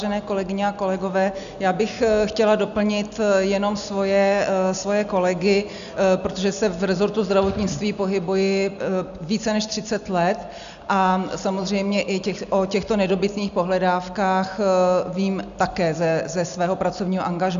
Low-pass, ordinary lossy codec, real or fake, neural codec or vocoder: 7.2 kHz; AAC, 96 kbps; real; none